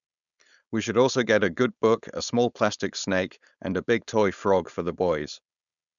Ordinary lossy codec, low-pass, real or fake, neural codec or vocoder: none; 7.2 kHz; fake; codec, 16 kHz, 4.8 kbps, FACodec